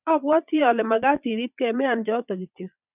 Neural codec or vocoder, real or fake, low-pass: vocoder, 22.05 kHz, 80 mel bands, Vocos; fake; 3.6 kHz